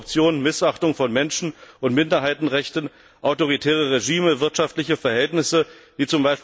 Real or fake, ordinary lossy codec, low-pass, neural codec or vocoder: real; none; none; none